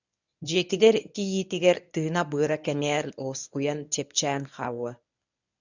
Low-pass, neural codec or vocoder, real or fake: 7.2 kHz; codec, 24 kHz, 0.9 kbps, WavTokenizer, medium speech release version 1; fake